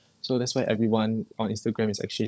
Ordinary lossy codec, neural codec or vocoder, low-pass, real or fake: none; codec, 16 kHz, 16 kbps, FunCodec, trained on LibriTTS, 50 frames a second; none; fake